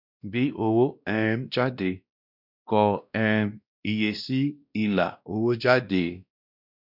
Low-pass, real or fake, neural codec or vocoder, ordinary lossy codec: 5.4 kHz; fake; codec, 16 kHz, 1 kbps, X-Codec, WavLM features, trained on Multilingual LibriSpeech; none